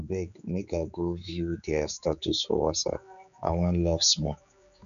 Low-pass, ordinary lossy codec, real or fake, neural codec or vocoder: 7.2 kHz; none; fake; codec, 16 kHz, 4 kbps, X-Codec, HuBERT features, trained on general audio